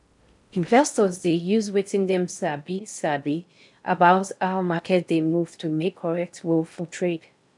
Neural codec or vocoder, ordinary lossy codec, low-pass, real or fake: codec, 16 kHz in and 24 kHz out, 0.6 kbps, FocalCodec, streaming, 4096 codes; none; 10.8 kHz; fake